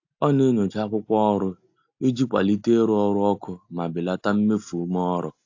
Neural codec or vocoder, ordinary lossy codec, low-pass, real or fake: none; AAC, 48 kbps; 7.2 kHz; real